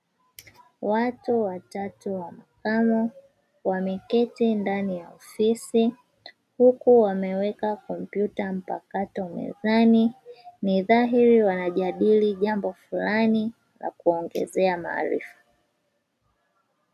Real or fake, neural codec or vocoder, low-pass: real; none; 14.4 kHz